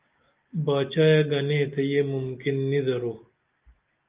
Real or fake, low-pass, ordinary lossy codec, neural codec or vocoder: real; 3.6 kHz; Opus, 32 kbps; none